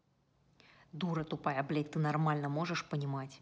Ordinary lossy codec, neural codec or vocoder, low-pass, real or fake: none; none; none; real